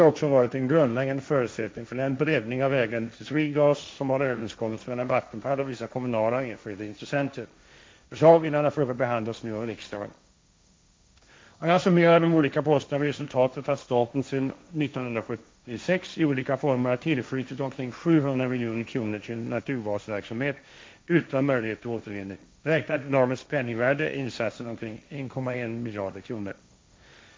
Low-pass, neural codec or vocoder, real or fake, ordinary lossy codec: none; codec, 16 kHz, 1.1 kbps, Voila-Tokenizer; fake; none